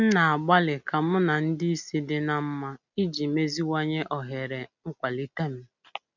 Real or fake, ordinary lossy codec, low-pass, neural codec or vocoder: real; none; 7.2 kHz; none